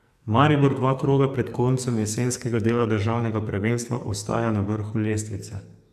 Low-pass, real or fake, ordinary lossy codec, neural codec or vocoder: 14.4 kHz; fake; none; codec, 44.1 kHz, 2.6 kbps, SNAC